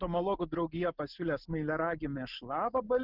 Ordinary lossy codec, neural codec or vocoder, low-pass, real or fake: Opus, 24 kbps; none; 5.4 kHz; real